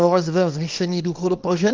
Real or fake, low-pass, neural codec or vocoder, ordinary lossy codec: fake; 7.2 kHz; codec, 24 kHz, 0.9 kbps, WavTokenizer, small release; Opus, 32 kbps